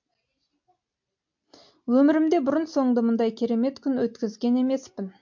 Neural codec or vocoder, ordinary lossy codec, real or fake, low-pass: none; MP3, 48 kbps; real; 7.2 kHz